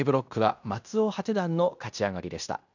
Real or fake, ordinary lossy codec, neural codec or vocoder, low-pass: fake; none; codec, 16 kHz in and 24 kHz out, 0.9 kbps, LongCat-Audio-Codec, fine tuned four codebook decoder; 7.2 kHz